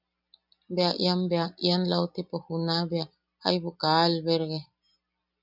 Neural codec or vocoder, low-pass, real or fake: none; 5.4 kHz; real